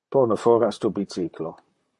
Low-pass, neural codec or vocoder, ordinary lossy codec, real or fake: 10.8 kHz; vocoder, 44.1 kHz, 128 mel bands, Pupu-Vocoder; MP3, 48 kbps; fake